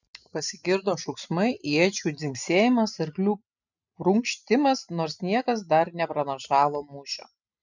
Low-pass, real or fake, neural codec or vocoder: 7.2 kHz; real; none